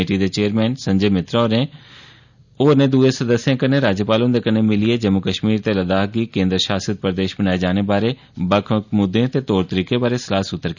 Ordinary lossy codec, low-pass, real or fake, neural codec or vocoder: none; 7.2 kHz; real; none